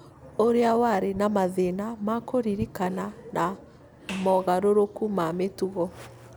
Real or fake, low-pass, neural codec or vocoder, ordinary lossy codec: real; none; none; none